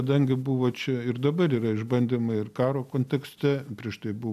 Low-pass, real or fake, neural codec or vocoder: 14.4 kHz; real; none